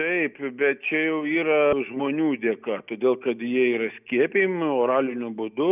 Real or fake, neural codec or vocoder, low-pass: real; none; 3.6 kHz